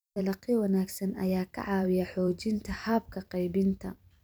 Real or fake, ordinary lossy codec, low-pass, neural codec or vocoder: fake; none; none; vocoder, 44.1 kHz, 128 mel bands every 256 samples, BigVGAN v2